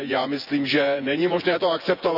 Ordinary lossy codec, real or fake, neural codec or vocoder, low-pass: none; fake; vocoder, 24 kHz, 100 mel bands, Vocos; 5.4 kHz